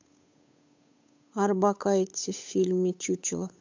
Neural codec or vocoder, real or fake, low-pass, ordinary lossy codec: codec, 16 kHz, 8 kbps, FunCodec, trained on Chinese and English, 25 frames a second; fake; 7.2 kHz; MP3, 64 kbps